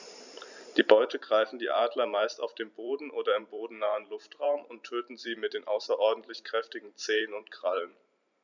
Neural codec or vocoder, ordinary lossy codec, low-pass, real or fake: vocoder, 44.1 kHz, 128 mel bands every 512 samples, BigVGAN v2; none; 7.2 kHz; fake